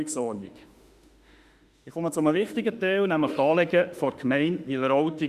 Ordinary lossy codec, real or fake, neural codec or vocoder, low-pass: Opus, 64 kbps; fake; autoencoder, 48 kHz, 32 numbers a frame, DAC-VAE, trained on Japanese speech; 14.4 kHz